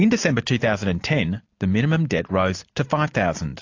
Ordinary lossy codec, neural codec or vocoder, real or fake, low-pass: AAC, 48 kbps; none; real; 7.2 kHz